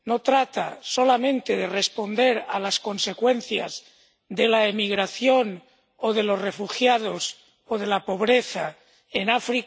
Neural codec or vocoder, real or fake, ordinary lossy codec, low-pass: none; real; none; none